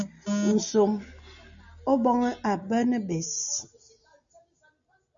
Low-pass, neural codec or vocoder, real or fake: 7.2 kHz; none; real